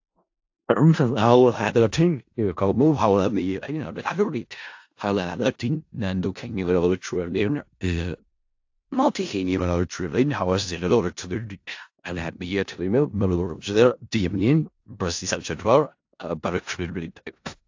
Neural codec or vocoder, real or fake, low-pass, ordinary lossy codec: codec, 16 kHz in and 24 kHz out, 0.4 kbps, LongCat-Audio-Codec, four codebook decoder; fake; 7.2 kHz; AAC, 48 kbps